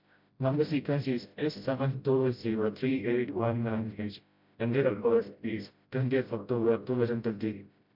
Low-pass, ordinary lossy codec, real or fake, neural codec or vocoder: 5.4 kHz; MP3, 48 kbps; fake; codec, 16 kHz, 0.5 kbps, FreqCodec, smaller model